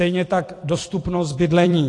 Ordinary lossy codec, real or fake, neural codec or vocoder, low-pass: AAC, 48 kbps; fake; vocoder, 44.1 kHz, 128 mel bands every 256 samples, BigVGAN v2; 10.8 kHz